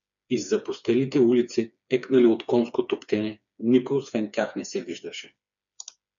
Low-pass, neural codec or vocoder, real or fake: 7.2 kHz; codec, 16 kHz, 4 kbps, FreqCodec, smaller model; fake